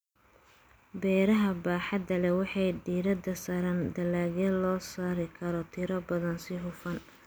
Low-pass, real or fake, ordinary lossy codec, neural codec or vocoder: none; real; none; none